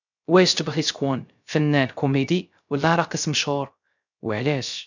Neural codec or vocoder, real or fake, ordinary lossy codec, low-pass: codec, 16 kHz, 0.3 kbps, FocalCodec; fake; none; 7.2 kHz